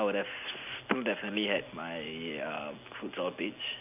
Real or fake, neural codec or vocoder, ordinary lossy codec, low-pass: real; none; none; 3.6 kHz